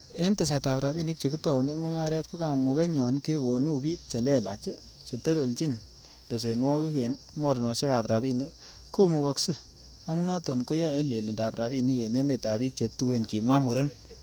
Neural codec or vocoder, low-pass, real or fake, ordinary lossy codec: codec, 44.1 kHz, 2.6 kbps, DAC; none; fake; none